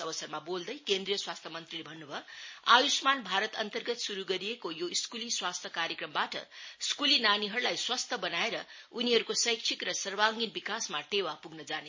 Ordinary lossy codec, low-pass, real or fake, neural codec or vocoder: MP3, 32 kbps; 7.2 kHz; real; none